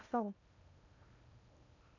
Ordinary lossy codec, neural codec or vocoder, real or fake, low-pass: none; codec, 16 kHz in and 24 kHz out, 0.8 kbps, FocalCodec, streaming, 65536 codes; fake; 7.2 kHz